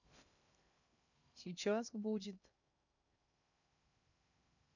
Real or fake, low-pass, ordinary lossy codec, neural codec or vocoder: fake; 7.2 kHz; none; codec, 16 kHz in and 24 kHz out, 0.6 kbps, FocalCodec, streaming, 2048 codes